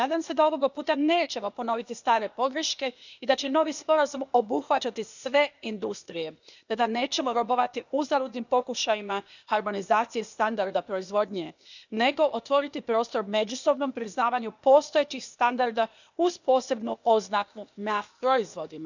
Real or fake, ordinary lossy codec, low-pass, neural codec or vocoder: fake; none; 7.2 kHz; codec, 16 kHz, 0.8 kbps, ZipCodec